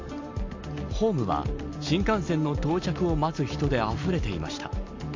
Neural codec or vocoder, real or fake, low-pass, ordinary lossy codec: none; real; 7.2 kHz; MP3, 48 kbps